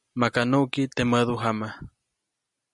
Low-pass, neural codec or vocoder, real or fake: 10.8 kHz; none; real